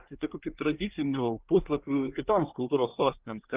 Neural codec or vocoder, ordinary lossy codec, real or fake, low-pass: codec, 24 kHz, 1 kbps, SNAC; Opus, 32 kbps; fake; 3.6 kHz